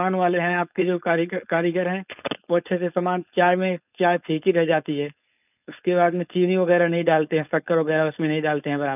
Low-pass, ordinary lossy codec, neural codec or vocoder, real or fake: 3.6 kHz; none; codec, 16 kHz, 4.8 kbps, FACodec; fake